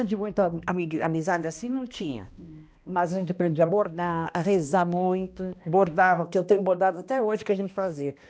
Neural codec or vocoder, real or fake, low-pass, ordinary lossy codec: codec, 16 kHz, 1 kbps, X-Codec, HuBERT features, trained on balanced general audio; fake; none; none